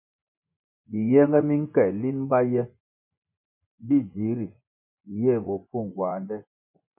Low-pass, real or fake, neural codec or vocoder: 3.6 kHz; fake; vocoder, 24 kHz, 100 mel bands, Vocos